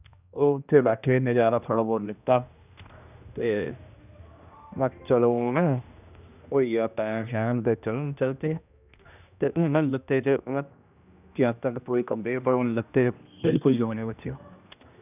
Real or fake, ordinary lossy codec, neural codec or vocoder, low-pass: fake; none; codec, 16 kHz, 1 kbps, X-Codec, HuBERT features, trained on general audio; 3.6 kHz